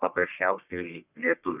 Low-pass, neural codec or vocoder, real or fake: 3.6 kHz; codec, 44.1 kHz, 1.7 kbps, Pupu-Codec; fake